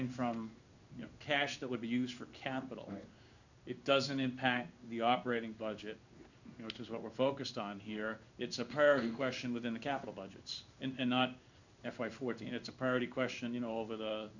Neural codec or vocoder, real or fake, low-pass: codec, 16 kHz in and 24 kHz out, 1 kbps, XY-Tokenizer; fake; 7.2 kHz